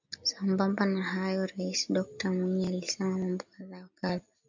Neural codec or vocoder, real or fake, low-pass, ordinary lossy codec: none; real; 7.2 kHz; MP3, 64 kbps